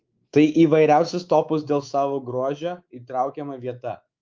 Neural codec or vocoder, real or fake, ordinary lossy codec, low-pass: codec, 24 kHz, 3.1 kbps, DualCodec; fake; Opus, 32 kbps; 7.2 kHz